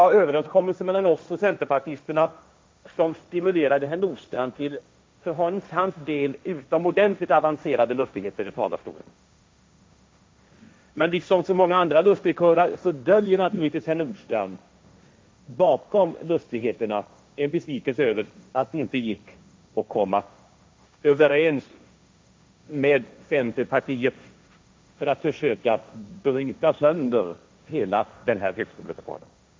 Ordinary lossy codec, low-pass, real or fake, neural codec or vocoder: none; none; fake; codec, 16 kHz, 1.1 kbps, Voila-Tokenizer